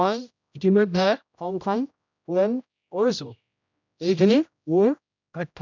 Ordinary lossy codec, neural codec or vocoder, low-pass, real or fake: none; codec, 16 kHz, 0.5 kbps, X-Codec, HuBERT features, trained on general audio; 7.2 kHz; fake